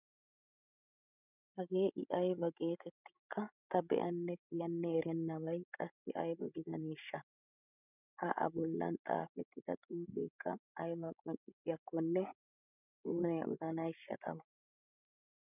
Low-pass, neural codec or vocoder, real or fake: 3.6 kHz; codec, 16 kHz, 16 kbps, FreqCodec, larger model; fake